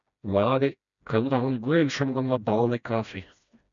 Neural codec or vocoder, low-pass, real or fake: codec, 16 kHz, 1 kbps, FreqCodec, smaller model; 7.2 kHz; fake